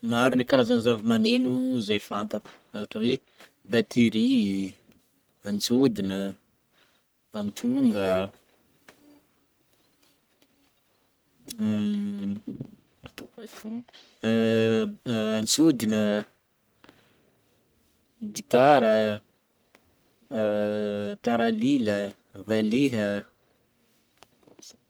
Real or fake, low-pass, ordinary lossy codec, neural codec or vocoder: fake; none; none; codec, 44.1 kHz, 1.7 kbps, Pupu-Codec